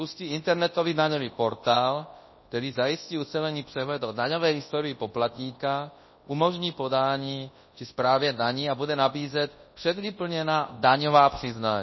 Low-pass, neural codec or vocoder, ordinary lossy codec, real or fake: 7.2 kHz; codec, 24 kHz, 0.9 kbps, WavTokenizer, large speech release; MP3, 24 kbps; fake